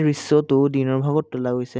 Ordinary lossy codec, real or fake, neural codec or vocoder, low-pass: none; real; none; none